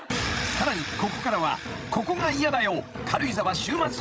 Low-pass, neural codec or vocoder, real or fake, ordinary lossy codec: none; codec, 16 kHz, 16 kbps, FreqCodec, larger model; fake; none